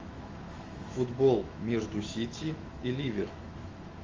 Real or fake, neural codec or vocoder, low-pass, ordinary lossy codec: fake; autoencoder, 48 kHz, 128 numbers a frame, DAC-VAE, trained on Japanese speech; 7.2 kHz; Opus, 32 kbps